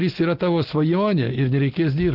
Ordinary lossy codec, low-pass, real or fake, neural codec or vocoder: Opus, 16 kbps; 5.4 kHz; real; none